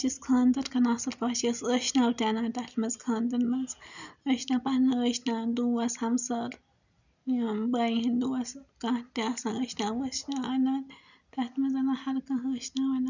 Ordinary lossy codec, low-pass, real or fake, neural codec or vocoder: none; 7.2 kHz; real; none